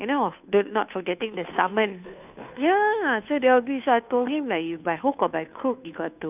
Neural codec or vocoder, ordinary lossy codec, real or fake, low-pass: codec, 16 kHz, 2 kbps, FunCodec, trained on Chinese and English, 25 frames a second; none; fake; 3.6 kHz